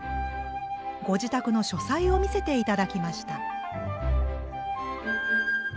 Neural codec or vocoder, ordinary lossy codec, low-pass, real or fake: none; none; none; real